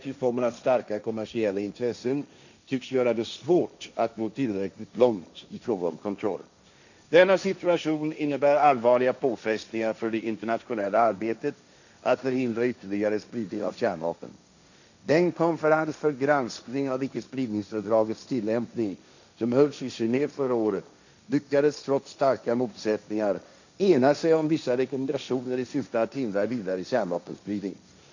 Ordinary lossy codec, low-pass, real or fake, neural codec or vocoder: none; 7.2 kHz; fake; codec, 16 kHz, 1.1 kbps, Voila-Tokenizer